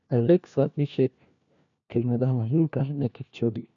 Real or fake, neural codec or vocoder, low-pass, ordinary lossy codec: fake; codec, 16 kHz, 1 kbps, FunCodec, trained on LibriTTS, 50 frames a second; 7.2 kHz; MP3, 96 kbps